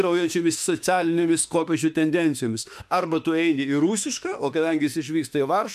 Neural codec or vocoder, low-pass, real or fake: autoencoder, 48 kHz, 32 numbers a frame, DAC-VAE, trained on Japanese speech; 14.4 kHz; fake